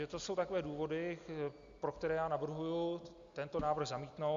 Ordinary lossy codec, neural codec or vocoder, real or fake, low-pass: Opus, 64 kbps; none; real; 7.2 kHz